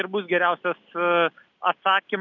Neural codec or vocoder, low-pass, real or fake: none; 7.2 kHz; real